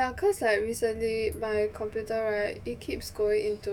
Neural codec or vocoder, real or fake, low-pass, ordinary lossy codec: autoencoder, 48 kHz, 128 numbers a frame, DAC-VAE, trained on Japanese speech; fake; 19.8 kHz; none